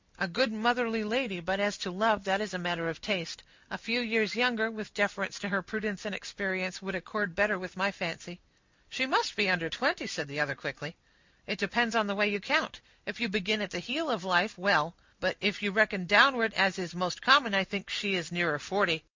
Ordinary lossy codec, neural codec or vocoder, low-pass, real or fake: MP3, 64 kbps; none; 7.2 kHz; real